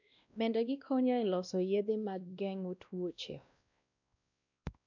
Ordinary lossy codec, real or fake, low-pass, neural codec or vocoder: none; fake; 7.2 kHz; codec, 16 kHz, 1 kbps, X-Codec, WavLM features, trained on Multilingual LibriSpeech